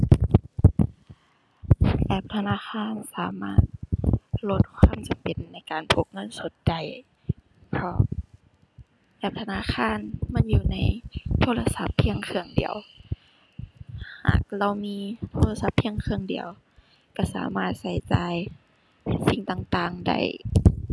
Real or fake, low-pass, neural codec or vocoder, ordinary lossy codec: real; none; none; none